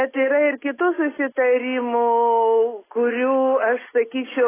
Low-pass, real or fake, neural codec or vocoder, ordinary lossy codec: 3.6 kHz; real; none; AAC, 16 kbps